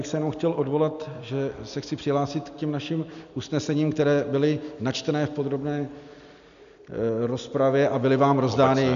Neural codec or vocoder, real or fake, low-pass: none; real; 7.2 kHz